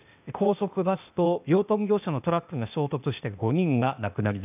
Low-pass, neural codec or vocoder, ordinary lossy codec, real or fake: 3.6 kHz; codec, 16 kHz, 0.8 kbps, ZipCodec; none; fake